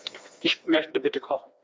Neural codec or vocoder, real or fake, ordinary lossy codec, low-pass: codec, 16 kHz, 2 kbps, FreqCodec, smaller model; fake; none; none